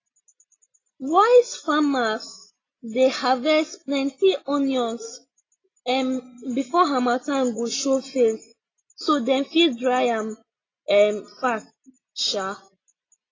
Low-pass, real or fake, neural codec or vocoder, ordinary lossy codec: 7.2 kHz; real; none; AAC, 32 kbps